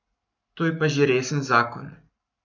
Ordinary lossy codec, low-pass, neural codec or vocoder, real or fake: none; 7.2 kHz; codec, 44.1 kHz, 7.8 kbps, Pupu-Codec; fake